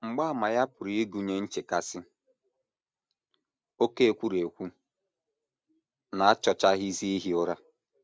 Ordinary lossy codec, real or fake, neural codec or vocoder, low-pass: none; real; none; none